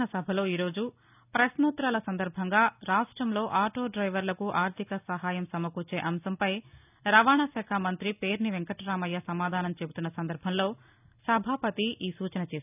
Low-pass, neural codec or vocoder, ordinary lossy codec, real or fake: 3.6 kHz; none; none; real